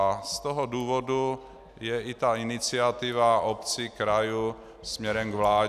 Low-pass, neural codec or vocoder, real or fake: 14.4 kHz; none; real